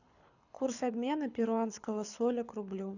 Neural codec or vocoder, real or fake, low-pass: codec, 24 kHz, 6 kbps, HILCodec; fake; 7.2 kHz